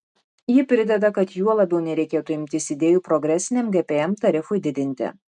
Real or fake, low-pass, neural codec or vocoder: real; 10.8 kHz; none